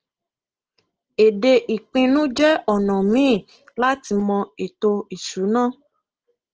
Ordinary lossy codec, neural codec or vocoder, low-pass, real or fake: Opus, 16 kbps; none; 7.2 kHz; real